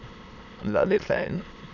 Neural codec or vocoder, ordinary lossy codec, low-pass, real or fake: autoencoder, 22.05 kHz, a latent of 192 numbers a frame, VITS, trained on many speakers; none; 7.2 kHz; fake